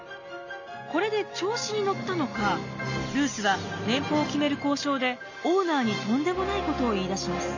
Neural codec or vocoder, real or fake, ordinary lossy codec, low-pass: none; real; none; 7.2 kHz